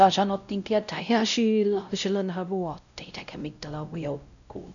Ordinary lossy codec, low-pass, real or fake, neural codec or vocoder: none; 7.2 kHz; fake; codec, 16 kHz, 0.5 kbps, X-Codec, WavLM features, trained on Multilingual LibriSpeech